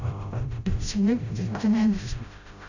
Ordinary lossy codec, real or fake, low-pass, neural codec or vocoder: none; fake; 7.2 kHz; codec, 16 kHz, 0.5 kbps, FreqCodec, smaller model